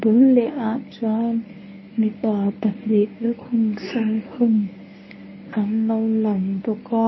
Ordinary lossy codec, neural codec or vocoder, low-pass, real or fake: MP3, 24 kbps; codec, 24 kHz, 0.9 kbps, WavTokenizer, medium speech release version 1; 7.2 kHz; fake